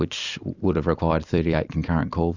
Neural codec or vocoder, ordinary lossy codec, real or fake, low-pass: vocoder, 44.1 kHz, 128 mel bands every 256 samples, BigVGAN v2; Opus, 64 kbps; fake; 7.2 kHz